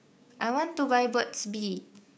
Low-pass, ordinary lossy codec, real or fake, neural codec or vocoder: none; none; fake; codec, 16 kHz, 6 kbps, DAC